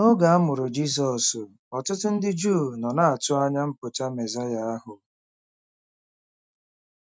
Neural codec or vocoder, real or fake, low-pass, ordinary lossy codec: none; real; none; none